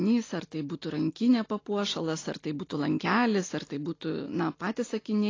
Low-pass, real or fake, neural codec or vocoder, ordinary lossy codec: 7.2 kHz; real; none; AAC, 32 kbps